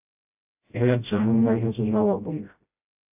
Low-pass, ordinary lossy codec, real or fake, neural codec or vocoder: 3.6 kHz; AAC, 32 kbps; fake; codec, 16 kHz, 0.5 kbps, FreqCodec, smaller model